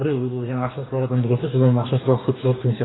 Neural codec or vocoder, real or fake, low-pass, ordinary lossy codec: codec, 44.1 kHz, 2.6 kbps, DAC; fake; 7.2 kHz; AAC, 16 kbps